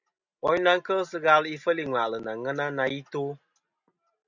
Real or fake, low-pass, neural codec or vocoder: real; 7.2 kHz; none